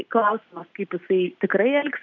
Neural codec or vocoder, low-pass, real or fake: none; 7.2 kHz; real